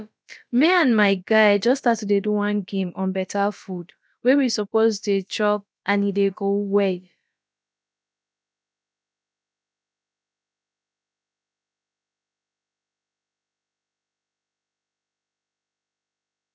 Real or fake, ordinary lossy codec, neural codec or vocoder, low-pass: fake; none; codec, 16 kHz, about 1 kbps, DyCAST, with the encoder's durations; none